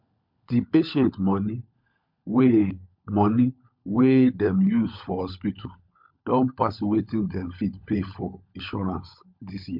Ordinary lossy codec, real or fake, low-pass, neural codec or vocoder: MP3, 48 kbps; fake; 5.4 kHz; codec, 16 kHz, 16 kbps, FunCodec, trained on LibriTTS, 50 frames a second